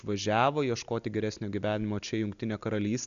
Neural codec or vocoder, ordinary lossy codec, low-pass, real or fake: none; MP3, 96 kbps; 7.2 kHz; real